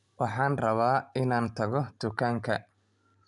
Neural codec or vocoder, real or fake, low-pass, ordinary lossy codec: none; real; 10.8 kHz; MP3, 96 kbps